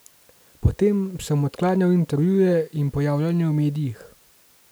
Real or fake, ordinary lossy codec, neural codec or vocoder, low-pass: real; none; none; none